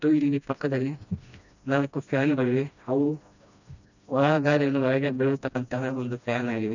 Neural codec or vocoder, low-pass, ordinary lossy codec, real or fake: codec, 16 kHz, 1 kbps, FreqCodec, smaller model; 7.2 kHz; none; fake